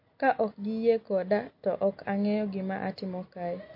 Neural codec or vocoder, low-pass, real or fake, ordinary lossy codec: none; 5.4 kHz; real; MP3, 32 kbps